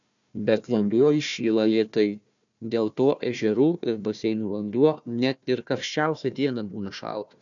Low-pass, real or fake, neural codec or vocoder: 7.2 kHz; fake; codec, 16 kHz, 1 kbps, FunCodec, trained on Chinese and English, 50 frames a second